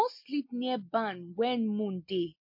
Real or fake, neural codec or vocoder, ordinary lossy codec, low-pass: real; none; MP3, 32 kbps; 5.4 kHz